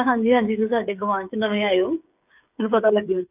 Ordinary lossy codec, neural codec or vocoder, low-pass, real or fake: none; codec, 16 kHz, 8 kbps, FreqCodec, smaller model; 3.6 kHz; fake